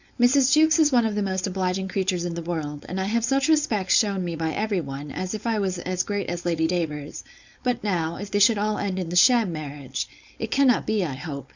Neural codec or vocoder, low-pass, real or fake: codec, 16 kHz, 4.8 kbps, FACodec; 7.2 kHz; fake